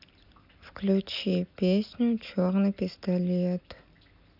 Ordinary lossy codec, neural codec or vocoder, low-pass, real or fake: none; none; 5.4 kHz; real